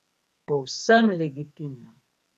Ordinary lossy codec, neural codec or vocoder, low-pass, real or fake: none; codec, 44.1 kHz, 2.6 kbps, SNAC; 14.4 kHz; fake